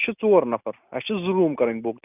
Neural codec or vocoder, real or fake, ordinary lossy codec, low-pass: none; real; none; 3.6 kHz